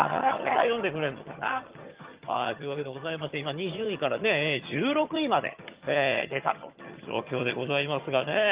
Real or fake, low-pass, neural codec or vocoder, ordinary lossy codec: fake; 3.6 kHz; vocoder, 22.05 kHz, 80 mel bands, HiFi-GAN; Opus, 32 kbps